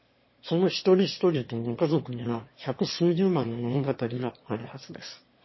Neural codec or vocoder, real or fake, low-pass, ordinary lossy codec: autoencoder, 22.05 kHz, a latent of 192 numbers a frame, VITS, trained on one speaker; fake; 7.2 kHz; MP3, 24 kbps